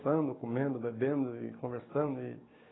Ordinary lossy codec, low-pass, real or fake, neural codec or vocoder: AAC, 16 kbps; 7.2 kHz; fake; codec, 16 kHz, 6 kbps, DAC